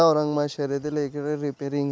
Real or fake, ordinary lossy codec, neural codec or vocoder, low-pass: real; none; none; none